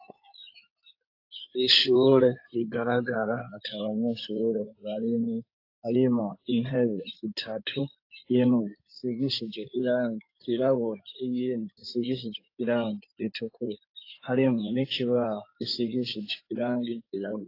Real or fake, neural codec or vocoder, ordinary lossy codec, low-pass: fake; codec, 16 kHz in and 24 kHz out, 2.2 kbps, FireRedTTS-2 codec; AAC, 32 kbps; 5.4 kHz